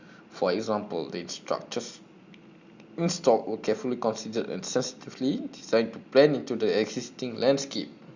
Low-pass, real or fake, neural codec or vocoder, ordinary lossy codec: 7.2 kHz; real; none; Opus, 64 kbps